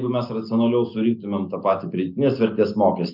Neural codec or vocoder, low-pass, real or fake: none; 5.4 kHz; real